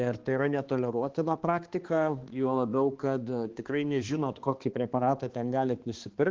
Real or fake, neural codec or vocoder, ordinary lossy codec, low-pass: fake; codec, 16 kHz, 2 kbps, X-Codec, HuBERT features, trained on balanced general audio; Opus, 16 kbps; 7.2 kHz